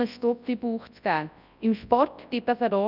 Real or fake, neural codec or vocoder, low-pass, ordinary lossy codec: fake; codec, 24 kHz, 0.9 kbps, WavTokenizer, large speech release; 5.4 kHz; none